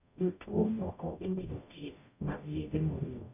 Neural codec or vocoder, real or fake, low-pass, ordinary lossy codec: codec, 44.1 kHz, 0.9 kbps, DAC; fake; 3.6 kHz; none